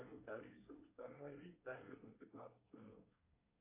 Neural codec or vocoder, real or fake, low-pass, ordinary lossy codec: codec, 24 kHz, 1 kbps, SNAC; fake; 3.6 kHz; AAC, 24 kbps